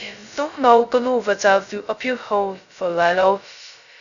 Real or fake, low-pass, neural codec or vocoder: fake; 7.2 kHz; codec, 16 kHz, 0.2 kbps, FocalCodec